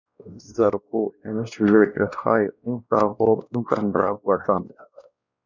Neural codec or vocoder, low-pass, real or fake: codec, 16 kHz, 1 kbps, X-Codec, WavLM features, trained on Multilingual LibriSpeech; 7.2 kHz; fake